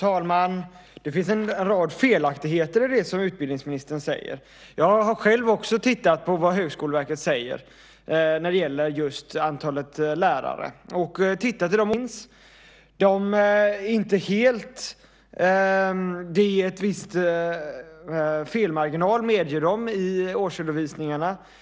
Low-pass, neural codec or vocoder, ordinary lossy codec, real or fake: none; none; none; real